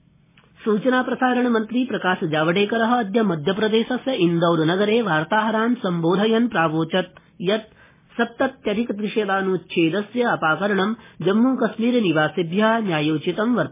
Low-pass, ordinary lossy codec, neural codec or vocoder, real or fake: 3.6 kHz; MP3, 16 kbps; none; real